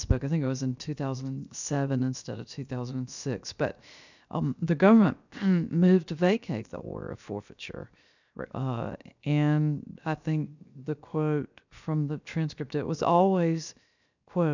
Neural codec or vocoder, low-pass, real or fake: codec, 16 kHz, 0.7 kbps, FocalCodec; 7.2 kHz; fake